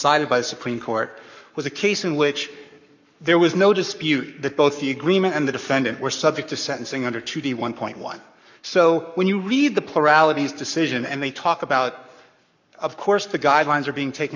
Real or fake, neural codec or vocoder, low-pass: fake; codec, 44.1 kHz, 7.8 kbps, Pupu-Codec; 7.2 kHz